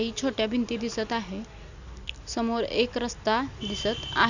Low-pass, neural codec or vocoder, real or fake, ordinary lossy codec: 7.2 kHz; none; real; none